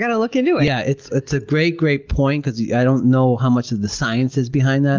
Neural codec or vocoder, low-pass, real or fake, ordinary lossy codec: none; 7.2 kHz; real; Opus, 24 kbps